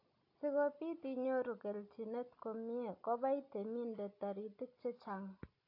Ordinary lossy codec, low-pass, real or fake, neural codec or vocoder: AAC, 32 kbps; 5.4 kHz; real; none